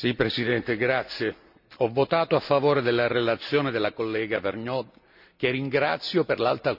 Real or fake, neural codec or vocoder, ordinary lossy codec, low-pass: real; none; none; 5.4 kHz